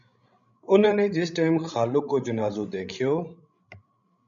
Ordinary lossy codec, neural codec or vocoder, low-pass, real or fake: MP3, 96 kbps; codec, 16 kHz, 16 kbps, FreqCodec, larger model; 7.2 kHz; fake